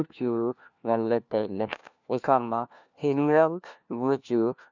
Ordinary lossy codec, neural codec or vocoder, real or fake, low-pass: none; codec, 16 kHz, 1 kbps, FunCodec, trained on LibriTTS, 50 frames a second; fake; 7.2 kHz